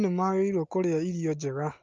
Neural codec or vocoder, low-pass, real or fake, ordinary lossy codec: none; 7.2 kHz; real; Opus, 32 kbps